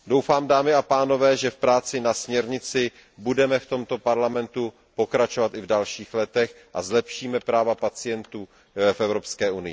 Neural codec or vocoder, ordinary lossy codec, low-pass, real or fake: none; none; none; real